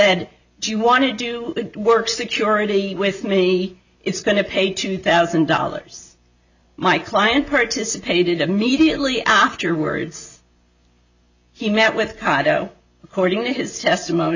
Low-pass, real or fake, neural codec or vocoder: 7.2 kHz; real; none